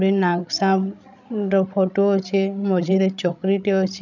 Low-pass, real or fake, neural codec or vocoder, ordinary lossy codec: 7.2 kHz; fake; codec, 16 kHz, 16 kbps, FreqCodec, larger model; none